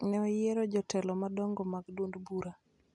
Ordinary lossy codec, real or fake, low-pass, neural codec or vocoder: none; real; 10.8 kHz; none